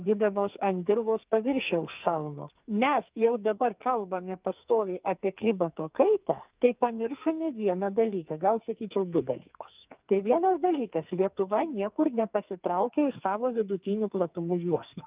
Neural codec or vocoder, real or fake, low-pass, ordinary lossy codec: codec, 44.1 kHz, 2.6 kbps, SNAC; fake; 3.6 kHz; Opus, 24 kbps